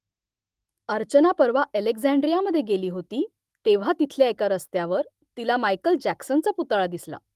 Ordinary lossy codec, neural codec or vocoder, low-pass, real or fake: Opus, 24 kbps; autoencoder, 48 kHz, 128 numbers a frame, DAC-VAE, trained on Japanese speech; 14.4 kHz; fake